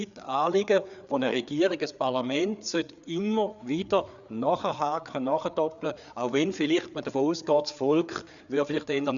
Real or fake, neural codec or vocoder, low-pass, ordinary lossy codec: fake; codec, 16 kHz, 4 kbps, FreqCodec, larger model; 7.2 kHz; none